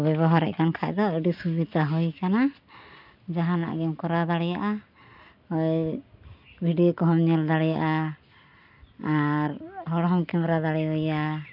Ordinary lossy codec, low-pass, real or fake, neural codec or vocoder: none; 5.4 kHz; real; none